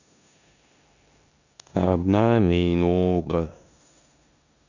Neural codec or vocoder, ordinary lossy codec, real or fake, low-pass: codec, 16 kHz in and 24 kHz out, 0.9 kbps, LongCat-Audio-Codec, four codebook decoder; none; fake; 7.2 kHz